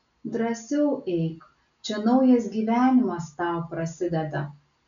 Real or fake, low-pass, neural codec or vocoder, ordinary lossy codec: real; 7.2 kHz; none; MP3, 96 kbps